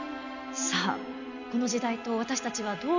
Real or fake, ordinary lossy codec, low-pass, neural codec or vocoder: real; none; 7.2 kHz; none